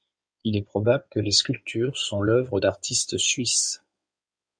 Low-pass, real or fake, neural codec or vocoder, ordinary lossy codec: 9.9 kHz; fake; codec, 16 kHz in and 24 kHz out, 2.2 kbps, FireRedTTS-2 codec; MP3, 64 kbps